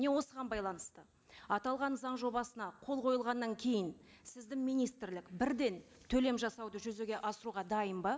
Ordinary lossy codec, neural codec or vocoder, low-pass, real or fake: none; none; none; real